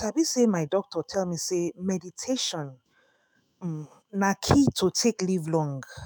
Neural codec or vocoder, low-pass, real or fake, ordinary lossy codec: autoencoder, 48 kHz, 128 numbers a frame, DAC-VAE, trained on Japanese speech; none; fake; none